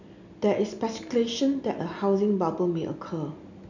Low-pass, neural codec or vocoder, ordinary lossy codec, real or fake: 7.2 kHz; none; none; real